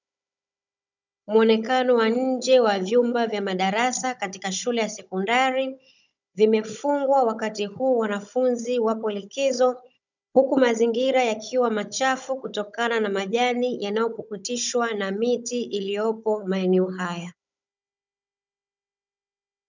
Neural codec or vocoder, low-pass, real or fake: codec, 16 kHz, 16 kbps, FunCodec, trained on Chinese and English, 50 frames a second; 7.2 kHz; fake